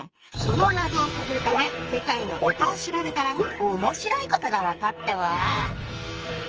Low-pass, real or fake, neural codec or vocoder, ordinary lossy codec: 7.2 kHz; fake; codec, 44.1 kHz, 2.6 kbps, SNAC; Opus, 24 kbps